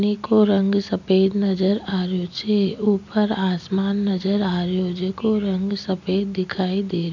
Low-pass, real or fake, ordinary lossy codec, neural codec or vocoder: 7.2 kHz; real; none; none